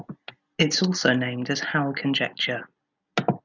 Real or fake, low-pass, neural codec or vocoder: real; 7.2 kHz; none